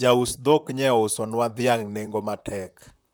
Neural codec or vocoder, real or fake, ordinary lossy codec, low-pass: vocoder, 44.1 kHz, 128 mel bands, Pupu-Vocoder; fake; none; none